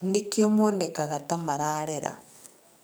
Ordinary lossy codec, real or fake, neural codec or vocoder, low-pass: none; fake; codec, 44.1 kHz, 2.6 kbps, SNAC; none